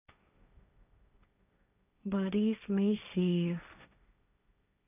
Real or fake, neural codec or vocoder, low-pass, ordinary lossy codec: fake; codec, 16 kHz, 1.1 kbps, Voila-Tokenizer; 3.6 kHz; none